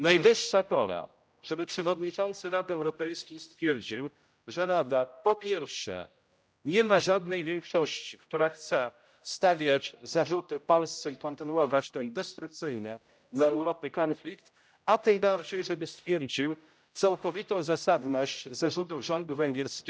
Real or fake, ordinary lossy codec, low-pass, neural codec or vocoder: fake; none; none; codec, 16 kHz, 0.5 kbps, X-Codec, HuBERT features, trained on general audio